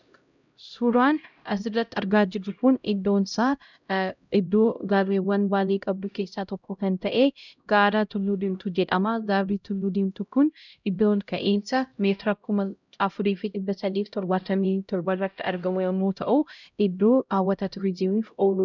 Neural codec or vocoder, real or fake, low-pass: codec, 16 kHz, 0.5 kbps, X-Codec, HuBERT features, trained on LibriSpeech; fake; 7.2 kHz